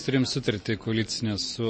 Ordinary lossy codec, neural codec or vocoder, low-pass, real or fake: MP3, 32 kbps; none; 9.9 kHz; real